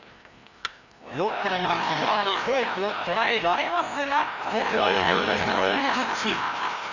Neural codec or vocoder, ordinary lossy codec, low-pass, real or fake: codec, 16 kHz, 1 kbps, FreqCodec, larger model; none; 7.2 kHz; fake